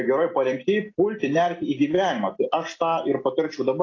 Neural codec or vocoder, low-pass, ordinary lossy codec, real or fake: none; 7.2 kHz; AAC, 32 kbps; real